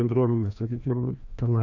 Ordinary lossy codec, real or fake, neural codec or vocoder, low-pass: AAC, 48 kbps; fake; codec, 16 kHz, 1 kbps, FreqCodec, larger model; 7.2 kHz